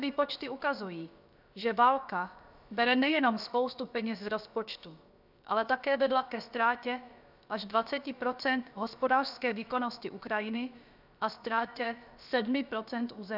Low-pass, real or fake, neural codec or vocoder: 5.4 kHz; fake; codec, 16 kHz, about 1 kbps, DyCAST, with the encoder's durations